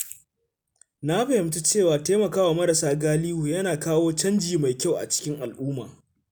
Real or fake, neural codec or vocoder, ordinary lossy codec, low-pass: real; none; none; none